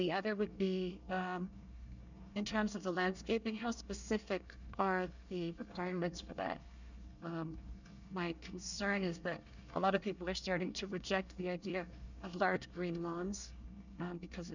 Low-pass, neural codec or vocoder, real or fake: 7.2 kHz; codec, 24 kHz, 1 kbps, SNAC; fake